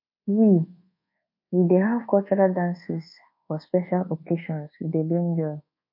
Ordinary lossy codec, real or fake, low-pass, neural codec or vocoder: MP3, 32 kbps; fake; 5.4 kHz; codec, 24 kHz, 1.2 kbps, DualCodec